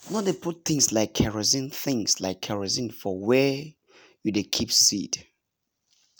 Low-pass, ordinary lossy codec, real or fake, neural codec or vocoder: none; none; real; none